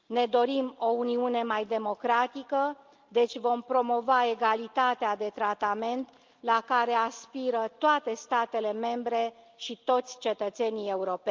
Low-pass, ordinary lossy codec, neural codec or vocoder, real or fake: 7.2 kHz; Opus, 24 kbps; none; real